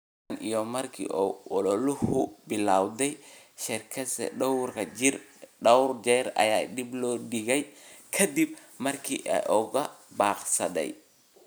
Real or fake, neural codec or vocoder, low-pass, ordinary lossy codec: real; none; none; none